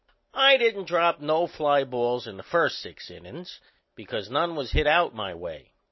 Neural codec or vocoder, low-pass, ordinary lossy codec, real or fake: none; 7.2 kHz; MP3, 24 kbps; real